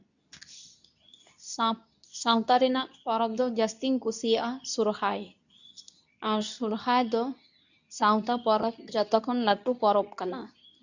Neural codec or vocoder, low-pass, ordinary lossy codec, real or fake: codec, 24 kHz, 0.9 kbps, WavTokenizer, medium speech release version 2; 7.2 kHz; none; fake